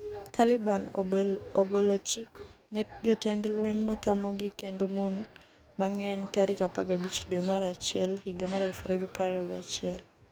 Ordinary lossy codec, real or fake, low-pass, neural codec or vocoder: none; fake; none; codec, 44.1 kHz, 2.6 kbps, DAC